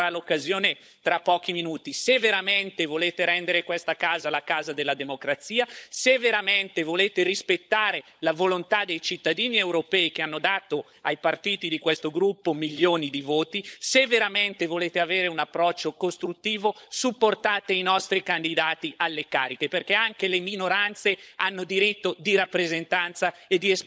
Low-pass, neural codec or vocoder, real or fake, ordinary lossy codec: none; codec, 16 kHz, 16 kbps, FunCodec, trained on LibriTTS, 50 frames a second; fake; none